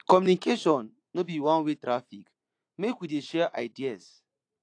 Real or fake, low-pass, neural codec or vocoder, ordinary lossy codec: real; 9.9 kHz; none; AAC, 48 kbps